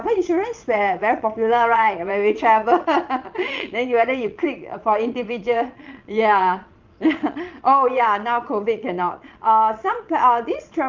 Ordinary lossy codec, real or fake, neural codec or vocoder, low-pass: Opus, 24 kbps; fake; vocoder, 22.05 kHz, 80 mel bands, Vocos; 7.2 kHz